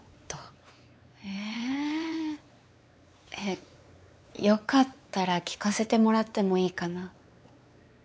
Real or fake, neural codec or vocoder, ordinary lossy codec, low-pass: fake; codec, 16 kHz, 4 kbps, X-Codec, WavLM features, trained on Multilingual LibriSpeech; none; none